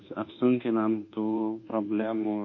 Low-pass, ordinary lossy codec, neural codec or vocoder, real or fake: 7.2 kHz; MP3, 32 kbps; codec, 24 kHz, 1.2 kbps, DualCodec; fake